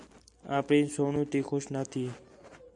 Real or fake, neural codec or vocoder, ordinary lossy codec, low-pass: real; none; MP3, 64 kbps; 10.8 kHz